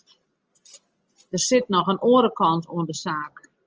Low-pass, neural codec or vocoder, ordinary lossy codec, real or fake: 7.2 kHz; none; Opus, 24 kbps; real